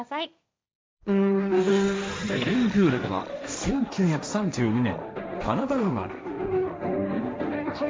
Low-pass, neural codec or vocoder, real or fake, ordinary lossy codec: none; codec, 16 kHz, 1.1 kbps, Voila-Tokenizer; fake; none